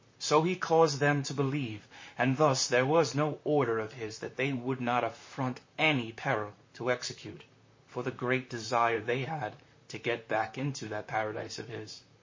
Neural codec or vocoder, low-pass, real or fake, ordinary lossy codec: vocoder, 44.1 kHz, 128 mel bands, Pupu-Vocoder; 7.2 kHz; fake; MP3, 32 kbps